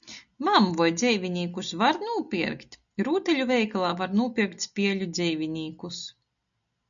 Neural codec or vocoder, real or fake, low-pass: none; real; 7.2 kHz